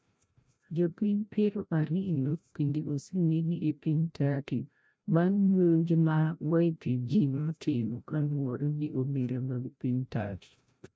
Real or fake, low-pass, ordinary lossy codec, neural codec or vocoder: fake; none; none; codec, 16 kHz, 0.5 kbps, FreqCodec, larger model